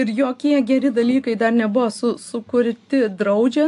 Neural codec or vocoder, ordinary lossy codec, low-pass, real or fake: none; AAC, 64 kbps; 10.8 kHz; real